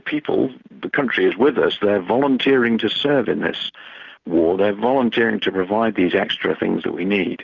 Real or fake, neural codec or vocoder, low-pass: real; none; 7.2 kHz